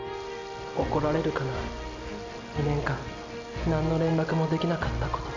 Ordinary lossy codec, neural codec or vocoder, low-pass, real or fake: MP3, 64 kbps; none; 7.2 kHz; real